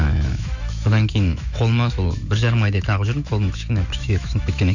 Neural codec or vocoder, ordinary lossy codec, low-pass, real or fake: autoencoder, 48 kHz, 128 numbers a frame, DAC-VAE, trained on Japanese speech; none; 7.2 kHz; fake